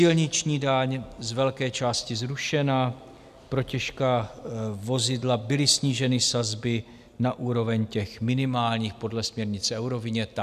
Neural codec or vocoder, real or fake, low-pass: none; real; 14.4 kHz